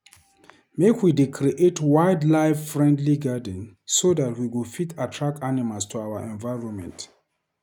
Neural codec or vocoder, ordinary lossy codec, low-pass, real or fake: none; none; 19.8 kHz; real